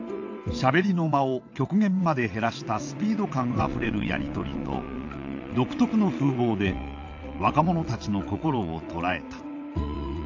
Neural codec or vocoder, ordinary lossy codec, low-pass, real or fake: vocoder, 22.05 kHz, 80 mel bands, WaveNeXt; AAC, 48 kbps; 7.2 kHz; fake